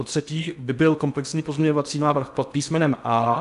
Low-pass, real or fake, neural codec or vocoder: 10.8 kHz; fake; codec, 16 kHz in and 24 kHz out, 0.6 kbps, FocalCodec, streaming, 2048 codes